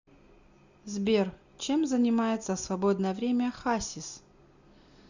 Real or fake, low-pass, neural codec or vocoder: real; 7.2 kHz; none